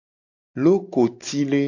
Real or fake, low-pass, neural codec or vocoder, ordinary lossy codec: real; 7.2 kHz; none; AAC, 48 kbps